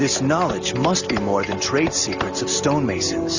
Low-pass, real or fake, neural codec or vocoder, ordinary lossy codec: 7.2 kHz; real; none; Opus, 64 kbps